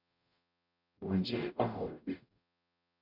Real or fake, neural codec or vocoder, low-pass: fake; codec, 44.1 kHz, 0.9 kbps, DAC; 5.4 kHz